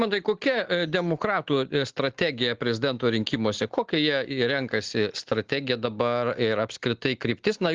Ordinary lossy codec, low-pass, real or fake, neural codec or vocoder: Opus, 16 kbps; 7.2 kHz; real; none